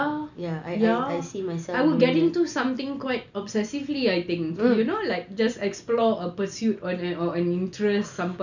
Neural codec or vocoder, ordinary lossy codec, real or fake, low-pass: none; none; real; 7.2 kHz